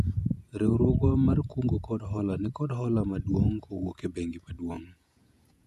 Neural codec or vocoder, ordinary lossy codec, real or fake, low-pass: vocoder, 48 kHz, 128 mel bands, Vocos; none; fake; 14.4 kHz